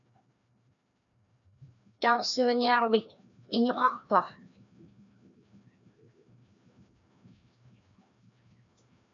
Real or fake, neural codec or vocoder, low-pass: fake; codec, 16 kHz, 1 kbps, FreqCodec, larger model; 7.2 kHz